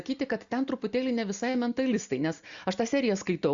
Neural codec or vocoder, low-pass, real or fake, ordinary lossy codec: none; 7.2 kHz; real; Opus, 64 kbps